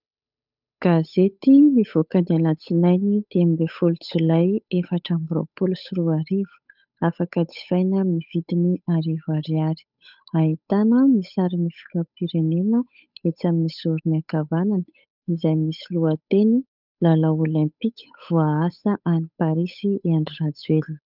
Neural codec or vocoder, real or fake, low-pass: codec, 16 kHz, 8 kbps, FunCodec, trained on Chinese and English, 25 frames a second; fake; 5.4 kHz